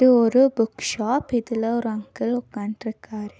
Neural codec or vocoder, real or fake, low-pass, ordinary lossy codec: none; real; none; none